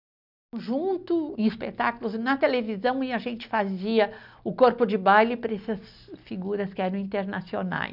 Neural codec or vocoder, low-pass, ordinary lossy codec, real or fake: none; 5.4 kHz; none; real